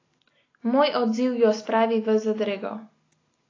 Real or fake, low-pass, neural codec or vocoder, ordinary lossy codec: real; 7.2 kHz; none; AAC, 32 kbps